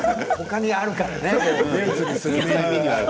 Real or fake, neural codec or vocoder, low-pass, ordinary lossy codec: real; none; none; none